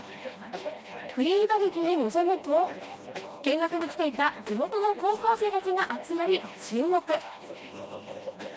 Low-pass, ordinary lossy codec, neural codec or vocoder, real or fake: none; none; codec, 16 kHz, 1 kbps, FreqCodec, smaller model; fake